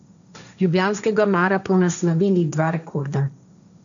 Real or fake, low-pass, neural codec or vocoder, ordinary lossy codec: fake; 7.2 kHz; codec, 16 kHz, 1.1 kbps, Voila-Tokenizer; none